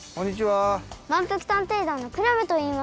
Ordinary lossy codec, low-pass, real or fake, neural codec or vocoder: none; none; real; none